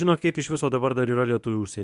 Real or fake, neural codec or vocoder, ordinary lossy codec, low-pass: fake; codec, 24 kHz, 0.9 kbps, WavTokenizer, medium speech release version 1; Opus, 64 kbps; 10.8 kHz